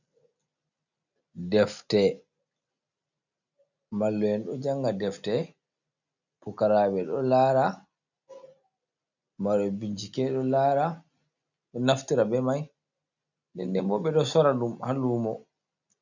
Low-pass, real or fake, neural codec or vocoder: 7.2 kHz; real; none